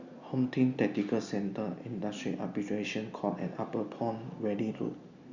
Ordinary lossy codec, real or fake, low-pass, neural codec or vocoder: Opus, 64 kbps; real; 7.2 kHz; none